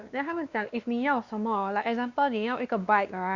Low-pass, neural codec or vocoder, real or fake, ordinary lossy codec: 7.2 kHz; codec, 16 kHz, 2 kbps, X-Codec, WavLM features, trained on Multilingual LibriSpeech; fake; Opus, 64 kbps